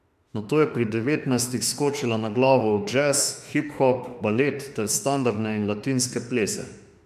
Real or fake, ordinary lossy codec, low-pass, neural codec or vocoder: fake; MP3, 96 kbps; 14.4 kHz; autoencoder, 48 kHz, 32 numbers a frame, DAC-VAE, trained on Japanese speech